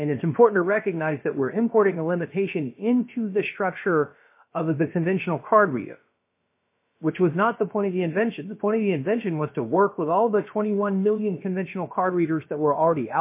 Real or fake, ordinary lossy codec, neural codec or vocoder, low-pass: fake; MP3, 24 kbps; codec, 16 kHz, about 1 kbps, DyCAST, with the encoder's durations; 3.6 kHz